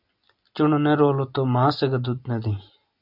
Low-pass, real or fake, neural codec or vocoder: 5.4 kHz; real; none